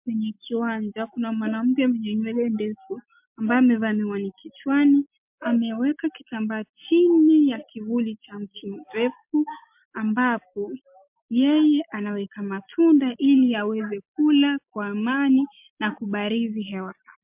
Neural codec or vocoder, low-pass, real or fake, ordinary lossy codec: none; 3.6 kHz; real; MP3, 32 kbps